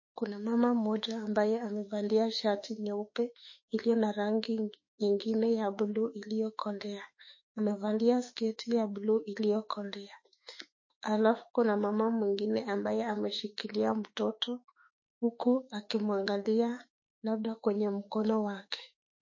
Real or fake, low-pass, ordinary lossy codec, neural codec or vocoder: fake; 7.2 kHz; MP3, 32 kbps; autoencoder, 48 kHz, 32 numbers a frame, DAC-VAE, trained on Japanese speech